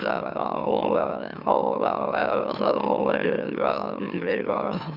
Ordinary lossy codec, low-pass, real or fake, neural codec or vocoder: none; 5.4 kHz; fake; autoencoder, 44.1 kHz, a latent of 192 numbers a frame, MeloTTS